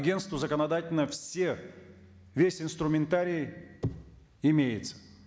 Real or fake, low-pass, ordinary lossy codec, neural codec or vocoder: real; none; none; none